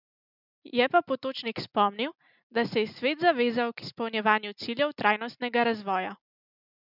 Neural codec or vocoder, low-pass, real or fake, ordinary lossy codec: none; 5.4 kHz; real; none